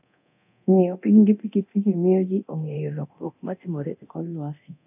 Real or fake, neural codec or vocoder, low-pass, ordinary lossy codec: fake; codec, 24 kHz, 0.9 kbps, DualCodec; 3.6 kHz; none